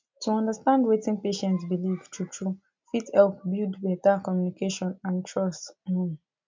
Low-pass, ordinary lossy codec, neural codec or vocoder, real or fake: 7.2 kHz; MP3, 64 kbps; none; real